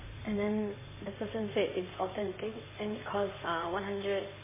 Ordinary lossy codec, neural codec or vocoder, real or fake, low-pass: MP3, 16 kbps; codec, 16 kHz in and 24 kHz out, 2.2 kbps, FireRedTTS-2 codec; fake; 3.6 kHz